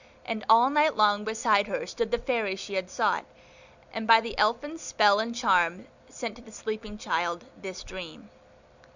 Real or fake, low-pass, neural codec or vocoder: real; 7.2 kHz; none